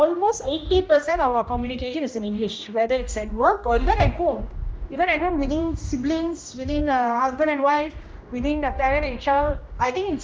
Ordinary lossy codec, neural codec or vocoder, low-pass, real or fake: none; codec, 16 kHz, 1 kbps, X-Codec, HuBERT features, trained on general audio; none; fake